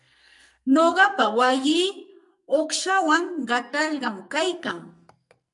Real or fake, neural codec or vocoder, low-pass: fake; codec, 44.1 kHz, 2.6 kbps, SNAC; 10.8 kHz